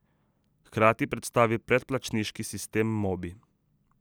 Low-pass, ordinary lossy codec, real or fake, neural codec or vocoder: none; none; real; none